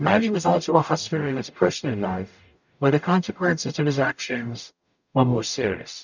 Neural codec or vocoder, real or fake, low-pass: codec, 44.1 kHz, 0.9 kbps, DAC; fake; 7.2 kHz